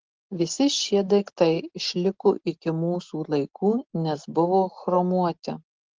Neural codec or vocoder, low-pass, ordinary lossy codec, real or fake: none; 7.2 kHz; Opus, 16 kbps; real